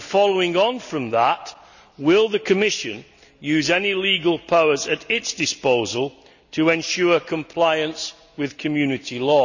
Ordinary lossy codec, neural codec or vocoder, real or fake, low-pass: none; none; real; 7.2 kHz